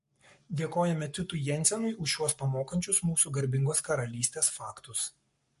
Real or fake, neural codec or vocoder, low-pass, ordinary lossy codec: fake; codec, 44.1 kHz, 7.8 kbps, Pupu-Codec; 14.4 kHz; MP3, 48 kbps